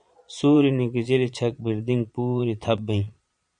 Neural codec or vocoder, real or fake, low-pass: vocoder, 22.05 kHz, 80 mel bands, Vocos; fake; 9.9 kHz